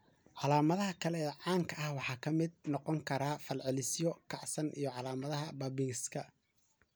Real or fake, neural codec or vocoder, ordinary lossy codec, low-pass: real; none; none; none